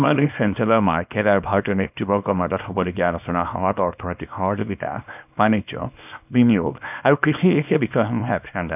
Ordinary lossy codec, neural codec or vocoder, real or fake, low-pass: none; codec, 24 kHz, 0.9 kbps, WavTokenizer, small release; fake; 3.6 kHz